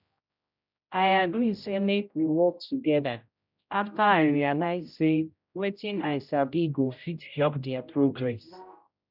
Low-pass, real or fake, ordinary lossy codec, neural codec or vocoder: 5.4 kHz; fake; none; codec, 16 kHz, 0.5 kbps, X-Codec, HuBERT features, trained on general audio